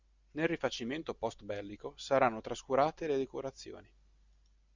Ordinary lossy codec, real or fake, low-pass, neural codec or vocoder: Opus, 64 kbps; real; 7.2 kHz; none